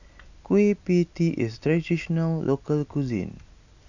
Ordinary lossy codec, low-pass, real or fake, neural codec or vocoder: none; 7.2 kHz; real; none